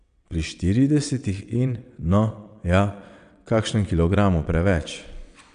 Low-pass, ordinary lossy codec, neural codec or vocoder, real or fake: 9.9 kHz; none; vocoder, 22.05 kHz, 80 mel bands, WaveNeXt; fake